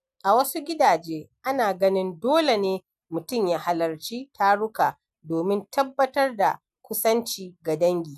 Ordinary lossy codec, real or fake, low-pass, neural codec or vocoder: none; real; 14.4 kHz; none